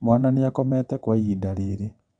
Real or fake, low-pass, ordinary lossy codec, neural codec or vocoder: fake; 9.9 kHz; none; vocoder, 22.05 kHz, 80 mel bands, WaveNeXt